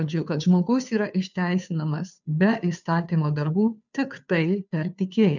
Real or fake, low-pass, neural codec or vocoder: fake; 7.2 kHz; codec, 16 kHz, 2 kbps, FunCodec, trained on Chinese and English, 25 frames a second